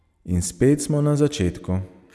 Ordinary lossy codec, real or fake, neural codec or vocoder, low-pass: none; real; none; none